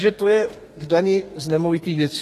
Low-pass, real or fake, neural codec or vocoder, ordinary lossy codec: 14.4 kHz; fake; codec, 32 kHz, 1.9 kbps, SNAC; AAC, 48 kbps